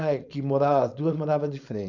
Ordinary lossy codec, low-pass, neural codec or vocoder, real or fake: none; 7.2 kHz; codec, 16 kHz, 4.8 kbps, FACodec; fake